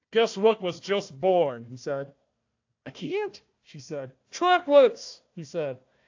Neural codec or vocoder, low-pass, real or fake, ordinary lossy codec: codec, 16 kHz, 1 kbps, FunCodec, trained on Chinese and English, 50 frames a second; 7.2 kHz; fake; AAC, 48 kbps